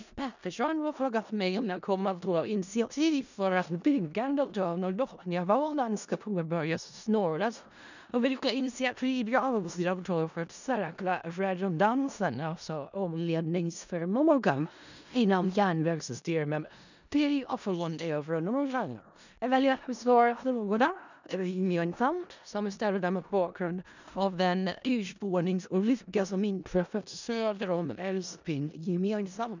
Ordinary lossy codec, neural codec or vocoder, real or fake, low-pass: none; codec, 16 kHz in and 24 kHz out, 0.4 kbps, LongCat-Audio-Codec, four codebook decoder; fake; 7.2 kHz